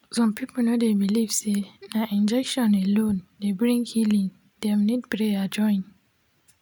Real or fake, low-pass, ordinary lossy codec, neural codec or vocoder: real; none; none; none